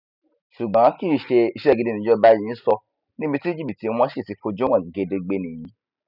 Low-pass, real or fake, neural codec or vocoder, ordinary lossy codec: 5.4 kHz; real; none; none